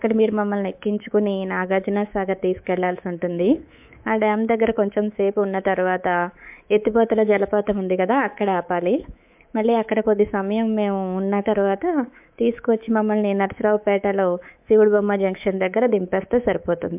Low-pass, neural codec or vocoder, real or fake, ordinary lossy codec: 3.6 kHz; codec, 24 kHz, 3.1 kbps, DualCodec; fake; MP3, 32 kbps